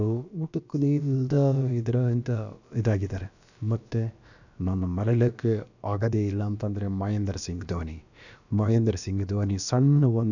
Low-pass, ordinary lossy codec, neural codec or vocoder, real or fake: 7.2 kHz; none; codec, 16 kHz, about 1 kbps, DyCAST, with the encoder's durations; fake